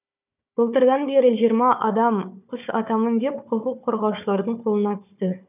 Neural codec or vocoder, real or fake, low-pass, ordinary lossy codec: codec, 16 kHz, 4 kbps, FunCodec, trained on Chinese and English, 50 frames a second; fake; 3.6 kHz; none